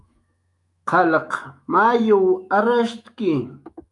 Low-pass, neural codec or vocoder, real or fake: 10.8 kHz; autoencoder, 48 kHz, 128 numbers a frame, DAC-VAE, trained on Japanese speech; fake